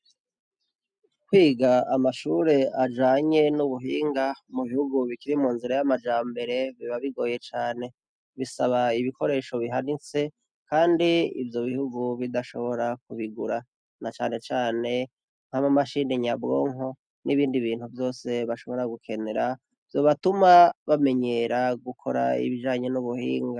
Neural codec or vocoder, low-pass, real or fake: none; 9.9 kHz; real